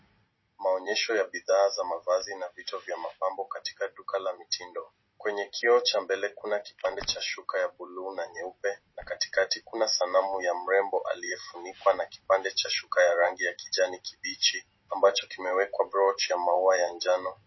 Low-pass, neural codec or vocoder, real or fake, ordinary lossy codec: 7.2 kHz; none; real; MP3, 24 kbps